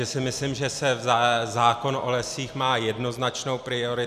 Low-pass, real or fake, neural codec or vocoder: 14.4 kHz; real; none